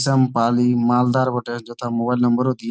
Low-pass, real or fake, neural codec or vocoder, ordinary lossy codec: none; real; none; none